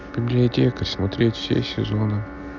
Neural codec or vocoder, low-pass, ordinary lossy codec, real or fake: none; 7.2 kHz; none; real